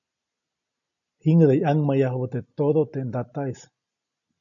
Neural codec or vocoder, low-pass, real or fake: none; 7.2 kHz; real